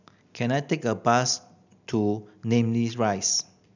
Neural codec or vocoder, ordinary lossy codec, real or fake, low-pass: none; none; real; 7.2 kHz